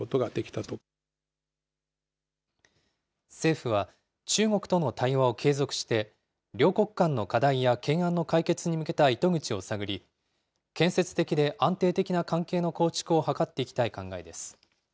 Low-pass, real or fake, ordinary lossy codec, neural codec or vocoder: none; real; none; none